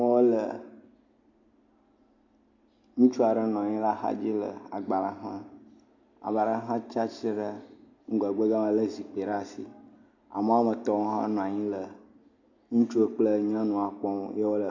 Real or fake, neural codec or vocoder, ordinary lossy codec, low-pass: real; none; AAC, 48 kbps; 7.2 kHz